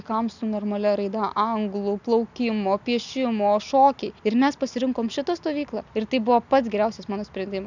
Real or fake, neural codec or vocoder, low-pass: real; none; 7.2 kHz